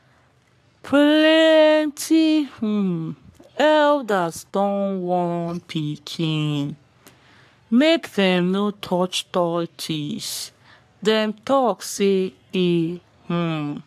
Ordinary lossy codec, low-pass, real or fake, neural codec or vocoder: AAC, 96 kbps; 14.4 kHz; fake; codec, 44.1 kHz, 3.4 kbps, Pupu-Codec